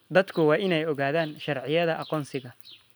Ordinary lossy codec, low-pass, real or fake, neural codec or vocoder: none; none; real; none